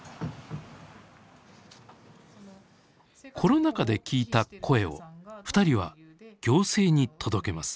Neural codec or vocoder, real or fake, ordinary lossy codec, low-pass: none; real; none; none